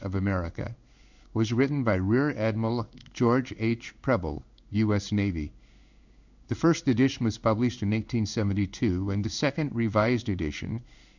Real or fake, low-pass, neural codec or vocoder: fake; 7.2 kHz; codec, 16 kHz in and 24 kHz out, 1 kbps, XY-Tokenizer